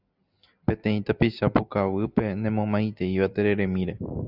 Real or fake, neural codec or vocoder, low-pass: real; none; 5.4 kHz